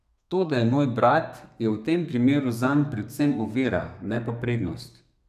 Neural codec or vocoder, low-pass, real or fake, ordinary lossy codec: codec, 32 kHz, 1.9 kbps, SNAC; 14.4 kHz; fake; none